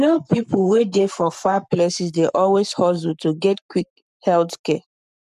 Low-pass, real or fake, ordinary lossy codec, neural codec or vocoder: 14.4 kHz; fake; none; codec, 44.1 kHz, 7.8 kbps, Pupu-Codec